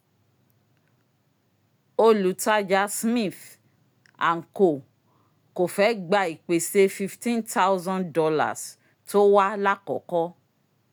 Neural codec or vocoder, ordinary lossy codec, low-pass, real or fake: none; none; none; real